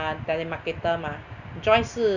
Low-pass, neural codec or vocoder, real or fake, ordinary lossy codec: 7.2 kHz; none; real; none